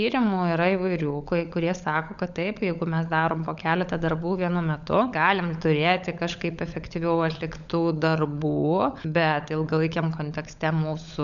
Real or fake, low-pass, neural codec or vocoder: fake; 7.2 kHz; codec, 16 kHz, 16 kbps, FunCodec, trained on LibriTTS, 50 frames a second